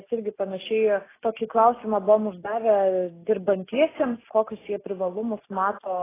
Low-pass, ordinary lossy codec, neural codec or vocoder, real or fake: 3.6 kHz; AAC, 16 kbps; none; real